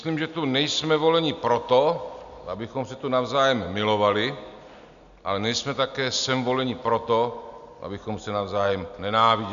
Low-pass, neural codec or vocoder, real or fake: 7.2 kHz; none; real